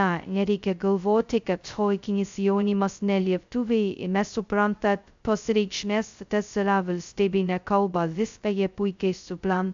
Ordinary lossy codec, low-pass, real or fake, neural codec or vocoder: AAC, 64 kbps; 7.2 kHz; fake; codec, 16 kHz, 0.2 kbps, FocalCodec